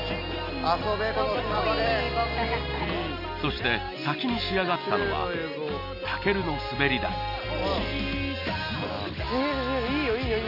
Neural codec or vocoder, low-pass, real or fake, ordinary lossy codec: none; 5.4 kHz; real; none